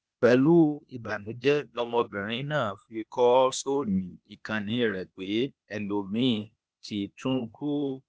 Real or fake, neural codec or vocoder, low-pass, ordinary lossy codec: fake; codec, 16 kHz, 0.8 kbps, ZipCodec; none; none